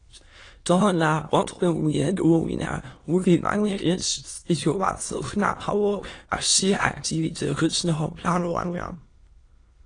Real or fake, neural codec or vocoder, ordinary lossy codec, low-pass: fake; autoencoder, 22.05 kHz, a latent of 192 numbers a frame, VITS, trained on many speakers; AAC, 32 kbps; 9.9 kHz